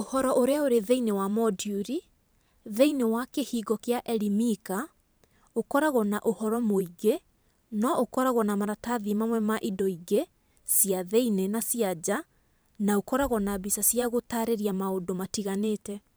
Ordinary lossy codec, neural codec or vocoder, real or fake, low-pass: none; vocoder, 44.1 kHz, 128 mel bands every 512 samples, BigVGAN v2; fake; none